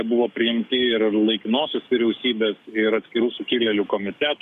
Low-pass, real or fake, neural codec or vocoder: 14.4 kHz; fake; codec, 44.1 kHz, 7.8 kbps, DAC